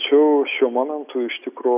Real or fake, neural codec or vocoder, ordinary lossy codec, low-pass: real; none; AAC, 32 kbps; 3.6 kHz